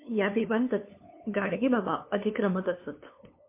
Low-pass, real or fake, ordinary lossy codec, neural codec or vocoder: 3.6 kHz; fake; MP3, 24 kbps; codec, 16 kHz, 2 kbps, FunCodec, trained on LibriTTS, 25 frames a second